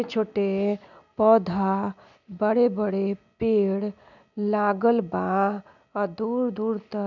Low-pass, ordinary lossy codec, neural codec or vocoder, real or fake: 7.2 kHz; none; none; real